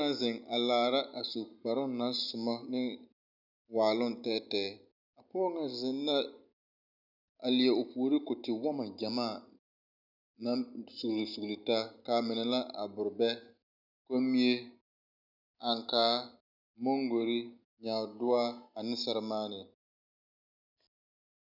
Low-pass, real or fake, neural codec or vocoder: 5.4 kHz; real; none